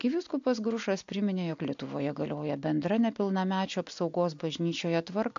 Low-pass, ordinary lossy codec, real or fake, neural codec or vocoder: 7.2 kHz; AAC, 64 kbps; real; none